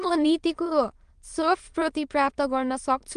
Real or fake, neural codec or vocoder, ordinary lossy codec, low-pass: fake; autoencoder, 22.05 kHz, a latent of 192 numbers a frame, VITS, trained on many speakers; Opus, 24 kbps; 9.9 kHz